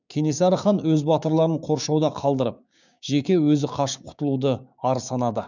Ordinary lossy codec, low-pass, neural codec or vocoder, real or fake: none; 7.2 kHz; codec, 16 kHz, 6 kbps, DAC; fake